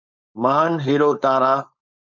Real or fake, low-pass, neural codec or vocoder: fake; 7.2 kHz; codec, 16 kHz, 4.8 kbps, FACodec